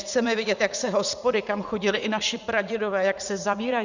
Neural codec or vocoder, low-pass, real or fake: vocoder, 44.1 kHz, 80 mel bands, Vocos; 7.2 kHz; fake